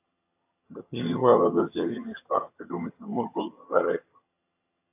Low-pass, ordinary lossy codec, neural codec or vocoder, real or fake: 3.6 kHz; AAC, 24 kbps; vocoder, 22.05 kHz, 80 mel bands, HiFi-GAN; fake